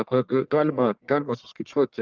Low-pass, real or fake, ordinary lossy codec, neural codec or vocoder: 7.2 kHz; fake; Opus, 32 kbps; codec, 44.1 kHz, 1.7 kbps, Pupu-Codec